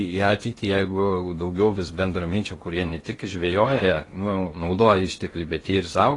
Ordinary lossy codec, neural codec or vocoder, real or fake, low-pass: AAC, 32 kbps; codec, 16 kHz in and 24 kHz out, 0.8 kbps, FocalCodec, streaming, 65536 codes; fake; 10.8 kHz